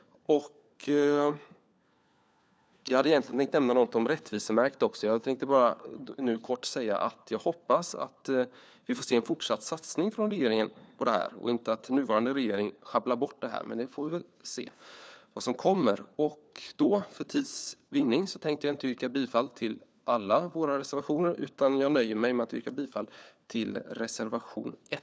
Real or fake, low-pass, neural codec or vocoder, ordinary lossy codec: fake; none; codec, 16 kHz, 4 kbps, FunCodec, trained on LibriTTS, 50 frames a second; none